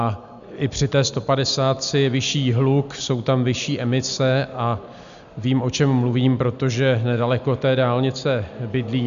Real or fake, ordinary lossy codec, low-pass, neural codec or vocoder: real; MP3, 96 kbps; 7.2 kHz; none